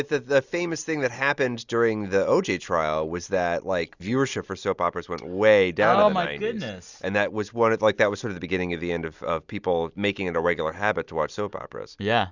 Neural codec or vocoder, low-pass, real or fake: none; 7.2 kHz; real